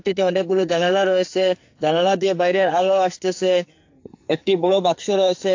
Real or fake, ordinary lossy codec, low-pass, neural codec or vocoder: fake; AAC, 48 kbps; 7.2 kHz; codec, 44.1 kHz, 2.6 kbps, SNAC